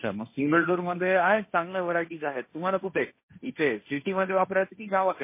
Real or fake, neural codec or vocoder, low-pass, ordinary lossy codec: fake; codec, 16 kHz, 1.1 kbps, Voila-Tokenizer; 3.6 kHz; MP3, 24 kbps